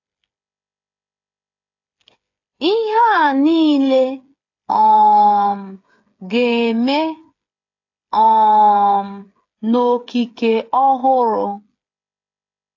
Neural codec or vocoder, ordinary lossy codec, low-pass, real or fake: codec, 16 kHz, 8 kbps, FreqCodec, smaller model; AAC, 48 kbps; 7.2 kHz; fake